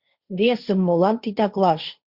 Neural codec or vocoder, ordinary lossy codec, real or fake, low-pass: codec, 16 kHz, 1.1 kbps, Voila-Tokenizer; Opus, 64 kbps; fake; 5.4 kHz